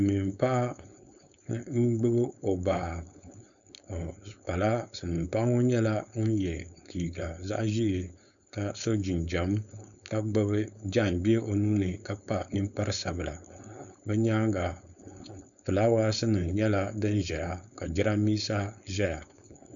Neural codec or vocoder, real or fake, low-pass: codec, 16 kHz, 4.8 kbps, FACodec; fake; 7.2 kHz